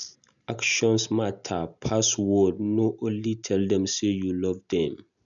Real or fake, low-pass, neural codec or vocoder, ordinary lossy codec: real; 7.2 kHz; none; none